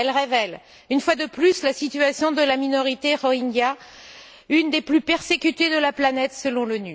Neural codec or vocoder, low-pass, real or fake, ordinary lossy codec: none; none; real; none